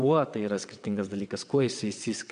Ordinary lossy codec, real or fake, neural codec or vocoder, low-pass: MP3, 96 kbps; fake; vocoder, 22.05 kHz, 80 mel bands, WaveNeXt; 9.9 kHz